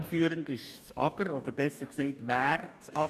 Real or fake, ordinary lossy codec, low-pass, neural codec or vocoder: fake; none; 14.4 kHz; codec, 44.1 kHz, 2.6 kbps, DAC